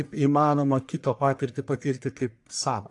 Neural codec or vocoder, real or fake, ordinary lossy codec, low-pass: codec, 44.1 kHz, 1.7 kbps, Pupu-Codec; fake; AAC, 64 kbps; 10.8 kHz